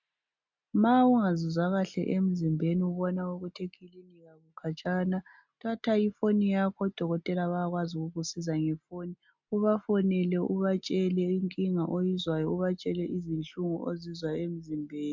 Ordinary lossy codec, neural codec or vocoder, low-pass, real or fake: MP3, 64 kbps; none; 7.2 kHz; real